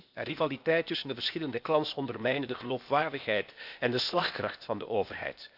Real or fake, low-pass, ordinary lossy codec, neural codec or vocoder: fake; 5.4 kHz; Opus, 64 kbps; codec, 16 kHz, 0.8 kbps, ZipCodec